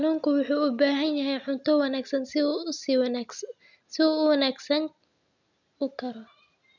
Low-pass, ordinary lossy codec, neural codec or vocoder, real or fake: 7.2 kHz; none; none; real